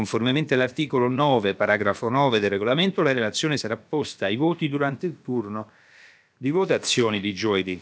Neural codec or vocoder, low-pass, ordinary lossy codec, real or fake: codec, 16 kHz, about 1 kbps, DyCAST, with the encoder's durations; none; none; fake